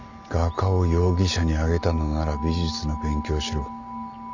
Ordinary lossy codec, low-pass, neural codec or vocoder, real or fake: none; 7.2 kHz; none; real